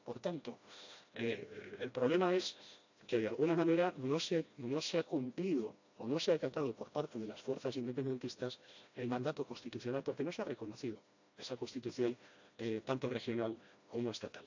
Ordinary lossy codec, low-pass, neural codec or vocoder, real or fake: AAC, 48 kbps; 7.2 kHz; codec, 16 kHz, 1 kbps, FreqCodec, smaller model; fake